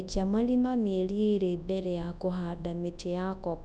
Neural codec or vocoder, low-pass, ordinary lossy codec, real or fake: codec, 24 kHz, 0.9 kbps, WavTokenizer, large speech release; none; none; fake